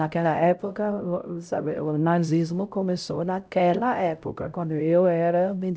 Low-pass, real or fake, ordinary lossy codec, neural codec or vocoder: none; fake; none; codec, 16 kHz, 0.5 kbps, X-Codec, HuBERT features, trained on LibriSpeech